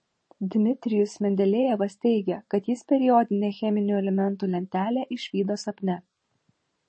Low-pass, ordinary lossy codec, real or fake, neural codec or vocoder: 9.9 kHz; MP3, 32 kbps; fake; vocoder, 44.1 kHz, 128 mel bands every 512 samples, BigVGAN v2